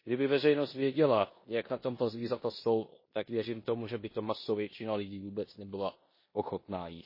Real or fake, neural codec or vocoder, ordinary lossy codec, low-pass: fake; codec, 16 kHz in and 24 kHz out, 0.9 kbps, LongCat-Audio-Codec, four codebook decoder; MP3, 24 kbps; 5.4 kHz